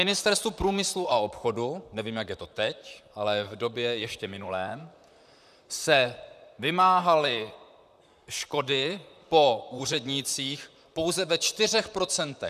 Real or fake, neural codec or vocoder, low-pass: fake; vocoder, 44.1 kHz, 128 mel bands, Pupu-Vocoder; 14.4 kHz